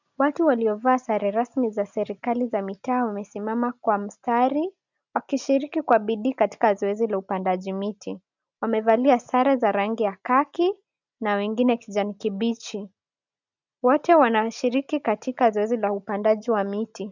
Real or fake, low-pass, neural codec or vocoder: real; 7.2 kHz; none